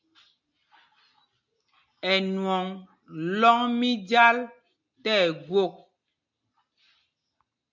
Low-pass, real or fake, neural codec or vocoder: 7.2 kHz; real; none